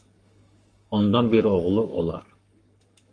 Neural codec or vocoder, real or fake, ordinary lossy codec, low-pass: codec, 44.1 kHz, 7.8 kbps, Pupu-Codec; fake; Opus, 32 kbps; 9.9 kHz